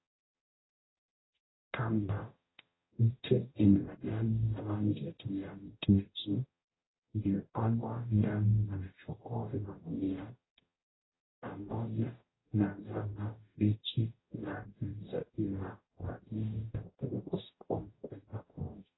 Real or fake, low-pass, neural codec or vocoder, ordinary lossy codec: fake; 7.2 kHz; codec, 44.1 kHz, 0.9 kbps, DAC; AAC, 16 kbps